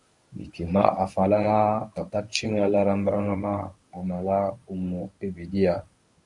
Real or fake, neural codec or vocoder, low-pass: fake; codec, 24 kHz, 0.9 kbps, WavTokenizer, medium speech release version 1; 10.8 kHz